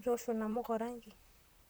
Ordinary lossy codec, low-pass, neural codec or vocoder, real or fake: none; none; vocoder, 44.1 kHz, 128 mel bands, Pupu-Vocoder; fake